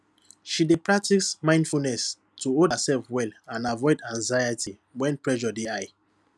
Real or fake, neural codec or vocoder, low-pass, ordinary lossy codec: real; none; none; none